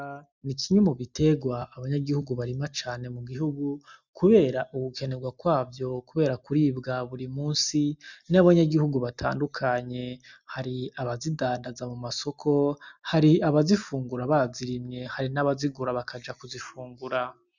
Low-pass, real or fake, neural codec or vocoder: 7.2 kHz; real; none